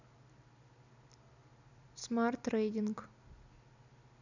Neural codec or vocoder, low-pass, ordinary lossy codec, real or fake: none; 7.2 kHz; none; real